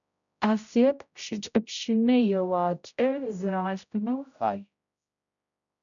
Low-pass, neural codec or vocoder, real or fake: 7.2 kHz; codec, 16 kHz, 0.5 kbps, X-Codec, HuBERT features, trained on general audio; fake